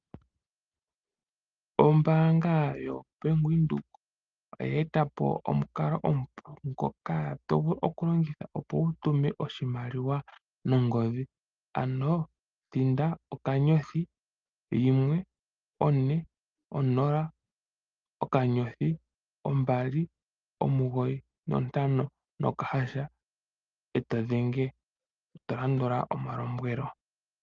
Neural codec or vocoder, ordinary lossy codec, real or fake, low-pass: none; Opus, 32 kbps; real; 7.2 kHz